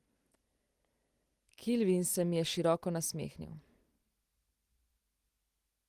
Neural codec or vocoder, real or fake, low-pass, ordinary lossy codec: none; real; 14.4 kHz; Opus, 24 kbps